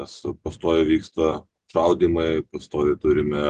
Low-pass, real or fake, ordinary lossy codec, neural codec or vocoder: 10.8 kHz; real; Opus, 16 kbps; none